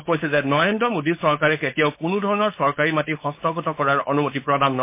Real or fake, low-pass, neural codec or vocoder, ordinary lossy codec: fake; 3.6 kHz; codec, 16 kHz, 4.8 kbps, FACodec; MP3, 24 kbps